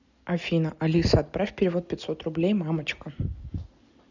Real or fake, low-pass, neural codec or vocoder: real; 7.2 kHz; none